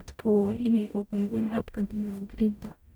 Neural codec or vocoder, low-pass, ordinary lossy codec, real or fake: codec, 44.1 kHz, 0.9 kbps, DAC; none; none; fake